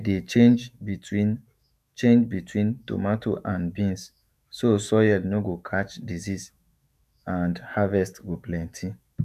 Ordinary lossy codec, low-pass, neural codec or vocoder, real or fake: none; 14.4 kHz; autoencoder, 48 kHz, 128 numbers a frame, DAC-VAE, trained on Japanese speech; fake